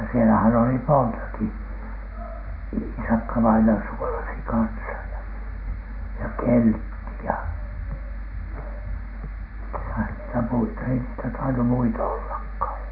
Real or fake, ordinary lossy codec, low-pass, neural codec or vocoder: real; none; 5.4 kHz; none